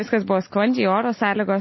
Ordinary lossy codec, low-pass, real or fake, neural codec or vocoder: MP3, 24 kbps; 7.2 kHz; real; none